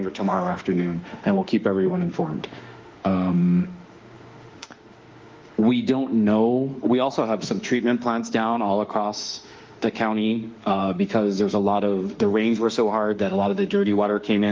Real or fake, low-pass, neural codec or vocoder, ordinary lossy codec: fake; 7.2 kHz; autoencoder, 48 kHz, 32 numbers a frame, DAC-VAE, trained on Japanese speech; Opus, 32 kbps